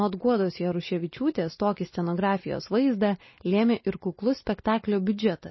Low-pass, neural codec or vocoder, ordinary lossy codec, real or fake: 7.2 kHz; none; MP3, 24 kbps; real